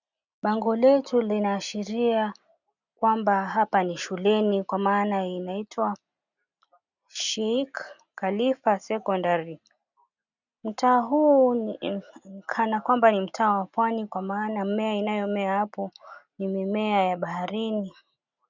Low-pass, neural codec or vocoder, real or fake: 7.2 kHz; none; real